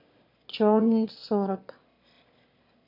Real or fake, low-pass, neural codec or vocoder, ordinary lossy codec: fake; 5.4 kHz; autoencoder, 22.05 kHz, a latent of 192 numbers a frame, VITS, trained on one speaker; MP3, 32 kbps